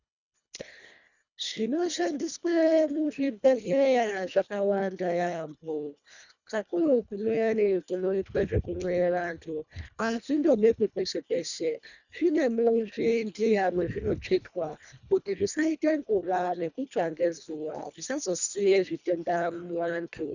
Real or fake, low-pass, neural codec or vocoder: fake; 7.2 kHz; codec, 24 kHz, 1.5 kbps, HILCodec